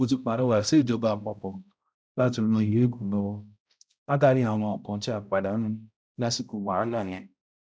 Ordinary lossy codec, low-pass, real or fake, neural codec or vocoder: none; none; fake; codec, 16 kHz, 0.5 kbps, X-Codec, HuBERT features, trained on balanced general audio